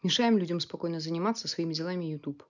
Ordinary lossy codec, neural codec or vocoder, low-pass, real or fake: none; none; 7.2 kHz; real